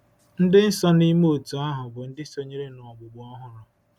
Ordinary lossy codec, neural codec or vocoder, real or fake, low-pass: none; none; real; 19.8 kHz